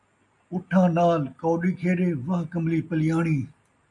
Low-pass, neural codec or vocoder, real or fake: 10.8 kHz; none; real